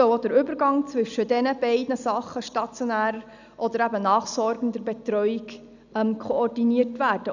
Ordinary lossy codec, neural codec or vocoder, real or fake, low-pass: none; none; real; 7.2 kHz